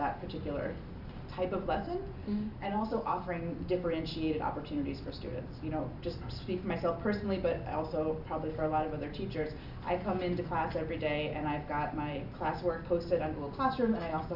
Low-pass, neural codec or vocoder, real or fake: 5.4 kHz; none; real